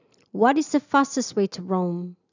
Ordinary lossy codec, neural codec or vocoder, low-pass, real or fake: none; none; 7.2 kHz; real